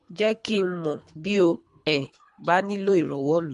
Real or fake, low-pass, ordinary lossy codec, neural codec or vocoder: fake; 10.8 kHz; MP3, 64 kbps; codec, 24 kHz, 3 kbps, HILCodec